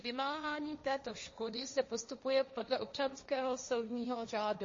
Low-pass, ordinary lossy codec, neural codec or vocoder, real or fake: 7.2 kHz; MP3, 32 kbps; codec, 16 kHz, 1.1 kbps, Voila-Tokenizer; fake